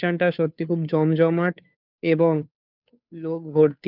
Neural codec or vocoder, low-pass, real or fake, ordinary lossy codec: codec, 16 kHz, 2 kbps, FunCodec, trained on Chinese and English, 25 frames a second; 5.4 kHz; fake; none